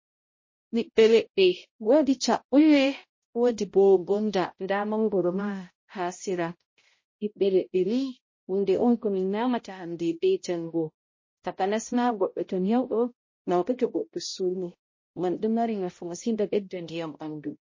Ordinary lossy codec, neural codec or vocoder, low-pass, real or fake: MP3, 32 kbps; codec, 16 kHz, 0.5 kbps, X-Codec, HuBERT features, trained on balanced general audio; 7.2 kHz; fake